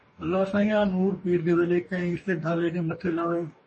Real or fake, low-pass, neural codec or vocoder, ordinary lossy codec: fake; 10.8 kHz; codec, 44.1 kHz, 2.6 kbps, DAC; MP3, 32 kbps